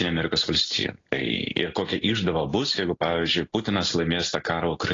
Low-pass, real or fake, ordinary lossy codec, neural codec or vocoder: 7.2 kHz; real; AAC, 32 kbps; none